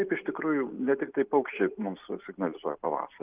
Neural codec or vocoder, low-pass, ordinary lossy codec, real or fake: none; 3.6 kHz; Opus, 24 kbps; real